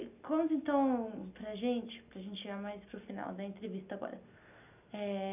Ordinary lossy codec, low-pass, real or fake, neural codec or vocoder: none; 3.6 kHz; real; none